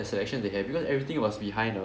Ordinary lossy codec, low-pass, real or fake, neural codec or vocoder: none; none; real; none